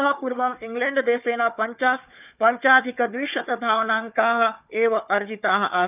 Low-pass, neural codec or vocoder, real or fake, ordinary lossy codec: 3.6 kHz; codec, 16 kHz, 4 kbps, FunCodec, trained on Chinese and English, 50 frames a second; fake; none